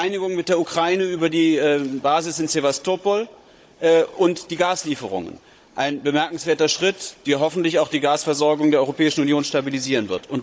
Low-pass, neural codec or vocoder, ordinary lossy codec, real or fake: none; codec, 16 kHz, 16 kbps, FunCodec, trained on Chinese and English, 50 frames a second; none; fake